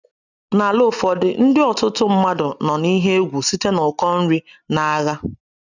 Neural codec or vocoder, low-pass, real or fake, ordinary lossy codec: none; 7.2 kHz; real; none